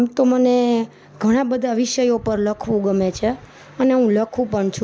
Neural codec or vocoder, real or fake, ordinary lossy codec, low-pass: none; real; none; none